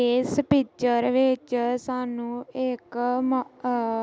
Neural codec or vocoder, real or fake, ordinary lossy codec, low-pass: none; real; none; none